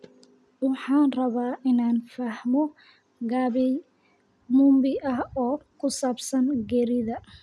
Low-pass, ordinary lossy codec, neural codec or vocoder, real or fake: none; none; none; real